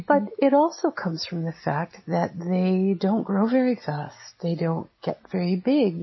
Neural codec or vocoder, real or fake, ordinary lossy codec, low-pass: none; real; MP3, 24 kbps; 7.2 kHz